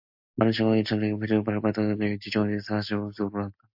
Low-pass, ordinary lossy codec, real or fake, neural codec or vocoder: 5.4 kHz; AAC, 48 kbps; real; none